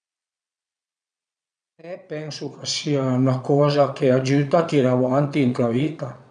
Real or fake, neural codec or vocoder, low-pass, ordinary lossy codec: fake; vocoder, 22.05 kHz, 80 mel bands, Vocos; 9.9 kHz; none